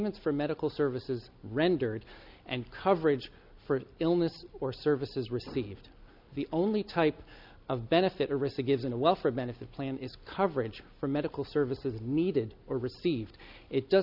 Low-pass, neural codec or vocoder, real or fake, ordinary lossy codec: 5.4 kHz; none; real; MP3, 48 kbps